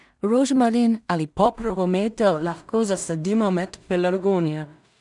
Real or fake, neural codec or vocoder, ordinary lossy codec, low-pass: fake; codec, 16 kHz in and 24 kHz out, 0.4 kbps, LongCat-Audio-Codec, two codebook decoder; none; 10.8 kHz